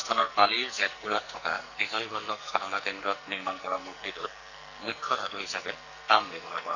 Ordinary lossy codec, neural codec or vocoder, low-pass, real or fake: none; codec, 44.1 kHz, 2.6 kbps, SNAC; 7.2 kHz; fake